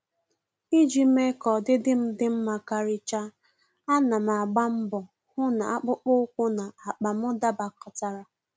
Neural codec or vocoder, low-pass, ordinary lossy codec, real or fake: none; none; none; real